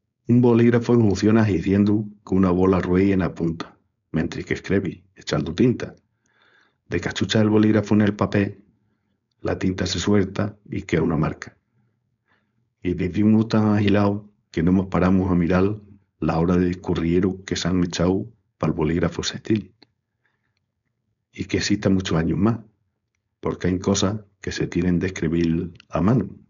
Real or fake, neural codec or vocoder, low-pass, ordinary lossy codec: fake; codec, 16 kHz, 4.8 kbps, FACodec; 7.2 kHz; none